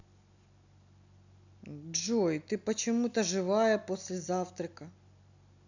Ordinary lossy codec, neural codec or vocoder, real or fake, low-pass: none; none; real; 7.2 kHz